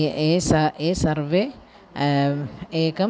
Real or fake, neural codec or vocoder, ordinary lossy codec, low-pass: real; none; none; none